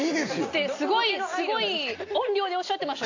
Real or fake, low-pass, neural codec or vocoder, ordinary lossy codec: real; 7.2 kHz; none; AAC, 48 kbps